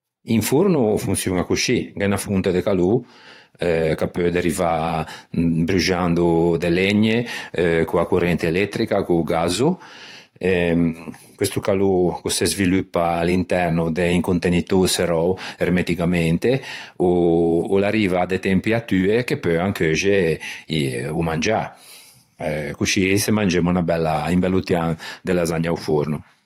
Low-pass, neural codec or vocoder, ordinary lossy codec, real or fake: 19.8 kHz; vocoder, 44.1 kHz, 128 mel bands every 512 samples, BigVGAN v2; AAC, 48 kbps; fake